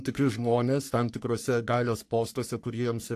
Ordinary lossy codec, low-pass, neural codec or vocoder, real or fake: AAC, 64 kbps; 14.4 kHz; codec, 44.1 kHz, 3.4 kbps, Pupu-Codec; fake